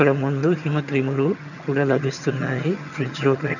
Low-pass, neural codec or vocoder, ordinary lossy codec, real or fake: 7.2 kHz; vocoder, 22.05 kHz, 80 mel bands, HiFi-GAN; none; fake